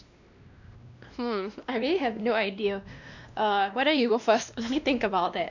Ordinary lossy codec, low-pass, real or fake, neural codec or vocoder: none; 7.2 kHz; fake; codec, 16 kHz, 1 kbps, X-Codec, WavLM features, trained on Multilingual LibriSpeech